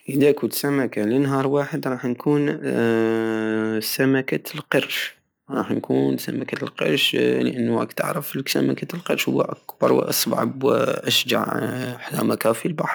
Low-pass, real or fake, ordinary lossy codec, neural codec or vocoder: none; real; none; none